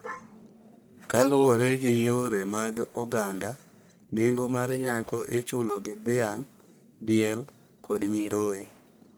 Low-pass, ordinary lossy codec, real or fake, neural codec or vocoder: none; none; fake; codec, 44.1 kHz, 1.7 kbps, Pupu-Codec